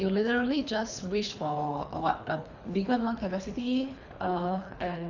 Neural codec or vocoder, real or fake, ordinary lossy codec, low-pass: codec, 24 kHz, 3 kbps, HILCodec; fake; none; 7.2 kHz